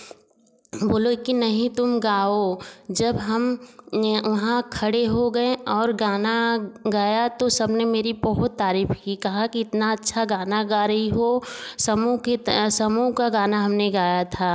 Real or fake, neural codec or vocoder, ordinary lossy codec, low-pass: real; none; none; none